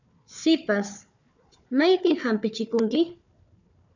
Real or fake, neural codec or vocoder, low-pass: fake; codec, 16 kHz, 4 kbps, FunCodec, trained on Chinese and English, 50 frames a second; 7.2 kHz